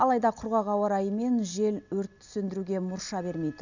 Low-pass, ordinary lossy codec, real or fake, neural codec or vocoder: 7.2 kHz; none; real; none